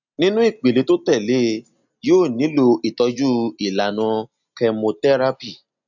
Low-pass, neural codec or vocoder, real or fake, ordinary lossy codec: 7.2 kHz; none; real; none